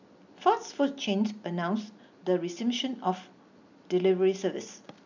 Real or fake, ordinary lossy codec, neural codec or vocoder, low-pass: real; none; none; 7.2 kHz